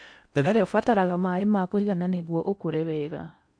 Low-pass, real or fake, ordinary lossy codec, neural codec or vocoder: 9.9 kHz; fake; none; codec, 16 kHz in and 24 kHz out, 0.6 kbps, FocalCodec, streaming, 4096 codes